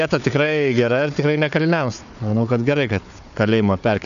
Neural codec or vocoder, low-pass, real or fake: codec, 16 kHz, 4 kbps, FunCodec, trained on Chinese and English, 50 frames a second; 7.2 kHz; fake